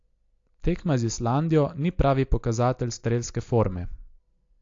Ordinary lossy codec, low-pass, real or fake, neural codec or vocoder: AAC, 48 kbps; 7.2 kHz; real; none